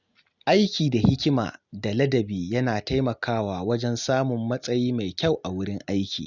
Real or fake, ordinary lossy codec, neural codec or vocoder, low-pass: real; none; none; 7.2 kHz